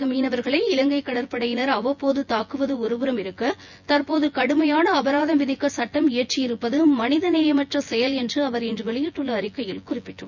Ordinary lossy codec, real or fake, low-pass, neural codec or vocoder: none; fake; 7.2 kHz; vocoder, 24 kHz, 100 mel bands, Vocos